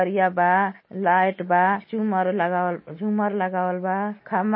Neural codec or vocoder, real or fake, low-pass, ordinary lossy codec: none; real; 7.2 kHz; MP3, 24 kbps